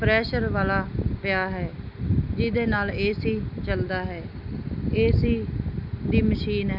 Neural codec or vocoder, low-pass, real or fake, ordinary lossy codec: none; 5.4 kHz; real; none